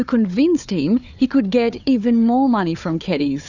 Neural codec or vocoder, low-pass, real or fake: codec, 16 kHz, 4 kbps, FunCodec, trained on Chinese and English, 50 frames a second; 7.2 kHz; fake